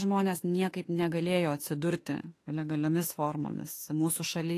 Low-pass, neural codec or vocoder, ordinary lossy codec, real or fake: 14.4 kHz; autoencoder, 48 kHz, 32 numbers a frame, DAC-VAE, trained on Japanese speech; AAC, 48 kbps; fake